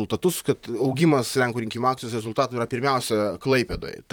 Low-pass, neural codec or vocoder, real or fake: 19.8 kHz; vocoder, 44.1 kHz, 128 mel bands, Pupu-Vocoder; fake